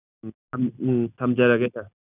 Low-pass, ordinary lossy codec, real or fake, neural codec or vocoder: 3.6 kHz; none; real; none